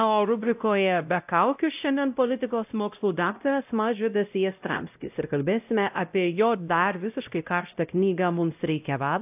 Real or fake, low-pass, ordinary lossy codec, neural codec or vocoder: fake; 3.6 kHz; AAC, 32 kbps; codec, 16 kHz, 0.5 kbps, X-Codec, WavLM features, trained on Multilingual LibriSpeech